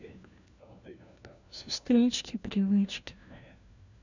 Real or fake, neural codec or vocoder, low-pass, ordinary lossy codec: fake; codec, 16 kHz, 1 kbps, FunCodec, trained on LibriTTS, 50 frames a second; 7.2 kHz; none